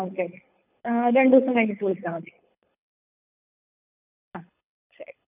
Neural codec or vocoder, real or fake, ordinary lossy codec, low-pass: codec, 24 kHz, 6 kbps, HILCodec; fake; none; 3.6 kHz